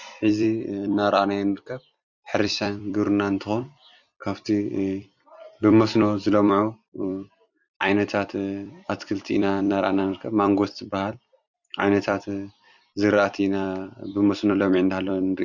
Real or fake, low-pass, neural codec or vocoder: fake; 7.2 kHz; vocoder, 44.1 kHz, 128 mel bands every 256 samples, BigVGAN v2